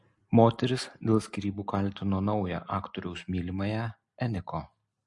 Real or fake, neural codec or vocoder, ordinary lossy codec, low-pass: real; none; MP3, 48 kbps; 10.8 kHz